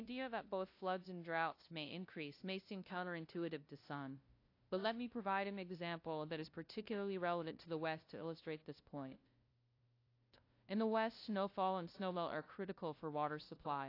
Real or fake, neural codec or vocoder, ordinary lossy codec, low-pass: fake; codec, 16 kHz, 0.5 kbps, FunCodec, trained on LibriTTS, 25 frames a second; AAC, 32 kbps; 5.4 kHz